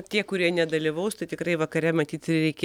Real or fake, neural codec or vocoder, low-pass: real; none; 19.8 kHz